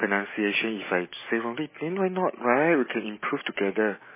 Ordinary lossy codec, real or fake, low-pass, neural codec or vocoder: MP3, 16 kbps; real; 3.6 kHz; none